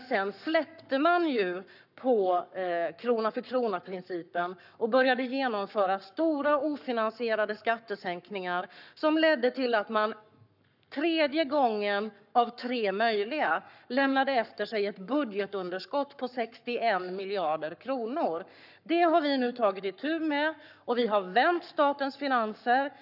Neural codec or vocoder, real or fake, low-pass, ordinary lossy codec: codec, 44.1 kHz, 7.8 kbps, Pupu-Codec; fake; 5.4 kHz; none